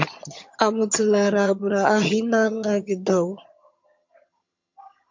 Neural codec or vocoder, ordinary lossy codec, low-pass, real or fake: vocoder, 22.05 kHz, 80 mel bands, HiFi-GAN; MP3, 48 kbps; 7.2 kHz; fake